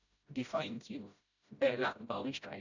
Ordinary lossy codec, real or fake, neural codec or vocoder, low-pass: none; fake; codec, 16 kHz, 0.5 kbps, FreqCodec, smaller model; 7.2 kHz